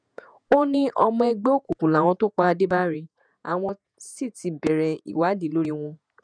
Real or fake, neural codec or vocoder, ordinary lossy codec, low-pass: fake; vocoder, 48 kHz, 128 mel bands, Vocos; none; 9.9 kHz